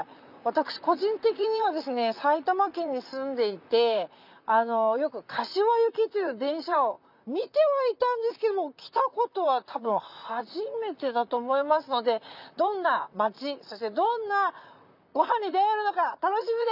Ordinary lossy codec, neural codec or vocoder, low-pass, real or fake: none; vocoder, 44.1 kHz, 128 mel bands, Pupu-Vocoder; 5.4 kHz; fake